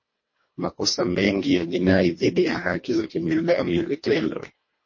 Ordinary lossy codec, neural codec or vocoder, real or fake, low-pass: MP3, 32 kbps; codec, 24 kHz, 1.5 kbps, HILCodec; fake; 7.2 kHz